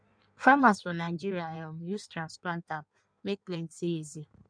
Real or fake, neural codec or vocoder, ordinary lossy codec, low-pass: fake; codec, 16 kHz in and 24 kHz out, 1.1 kbps, FireRedTTS-2 codec; none; 9.9 kHz